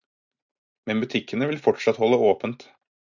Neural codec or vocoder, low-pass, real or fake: none; 7.2 kHz; real